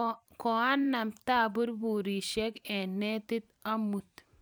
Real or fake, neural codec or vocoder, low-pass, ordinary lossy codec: fake; vocoder, 44.1 kHz, 128 mel bands every 512 samples, BigVGAN v2; none; none